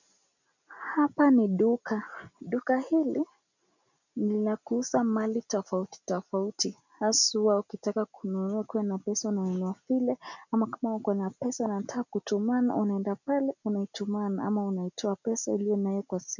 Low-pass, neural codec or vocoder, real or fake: 7.2 kHz; none; real